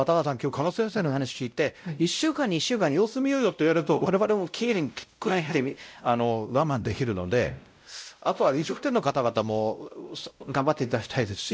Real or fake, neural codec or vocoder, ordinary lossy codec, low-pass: fake; codec, 16 kHz, 0.5 kbps, X-Codec, WavLM features, trained on Multilingual LibriSpeech; none; none